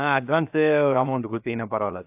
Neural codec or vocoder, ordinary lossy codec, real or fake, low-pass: codec, 16 kHz, 0.7 kbps, FocalCodec; AAC, 32 kbps; fake; 3.6 kHz